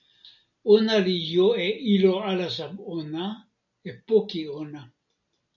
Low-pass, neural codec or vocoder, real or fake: 7.2 kHz; none; real